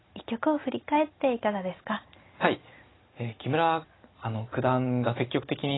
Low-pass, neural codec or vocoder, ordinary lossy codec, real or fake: 7.2 kHz; none; AAC, 16 kbps; real